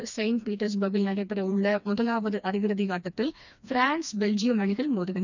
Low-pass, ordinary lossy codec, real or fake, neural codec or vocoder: 7.2 kHz; none; fake; codec, 16 kHz, 2 kbps, FreqCodec, smaller model